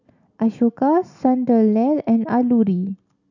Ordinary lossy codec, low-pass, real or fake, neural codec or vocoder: none; 7.2 kHz; real; none